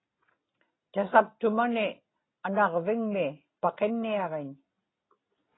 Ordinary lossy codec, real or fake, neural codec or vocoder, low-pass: AAC, 16 kbps; real; none; 7.2 kHz